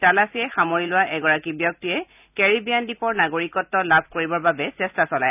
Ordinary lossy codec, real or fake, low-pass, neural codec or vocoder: none; real; 3.6 kHz; none